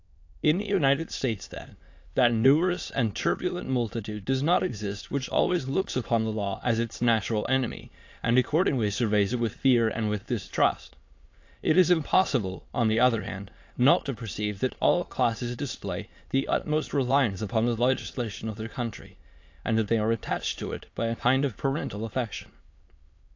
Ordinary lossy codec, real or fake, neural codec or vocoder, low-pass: AAC, 48 kbps; fake; autoencoder, 22.05 kHz, a latent of 192 numbers a frame, VITS, trained on many speakers; 7.2 kHz